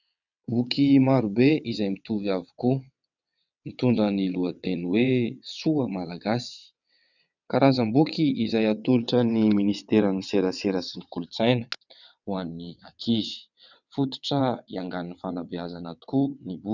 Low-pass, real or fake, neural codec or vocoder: 7.2 kHz; fake; vocoder, 22.05 kHz, 80 mel bands, WaveNeXt